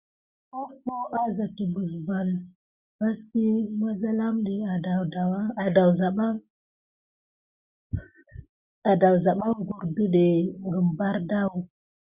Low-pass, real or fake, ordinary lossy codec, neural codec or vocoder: 3.6 kHz; real; Opus, 64 kbps; none